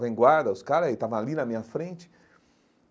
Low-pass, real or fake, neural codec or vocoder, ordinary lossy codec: none; real; none; none